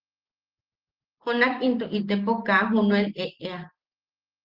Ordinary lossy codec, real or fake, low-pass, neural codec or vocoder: Opus, 16 kbps; real; 5.4 kHz; none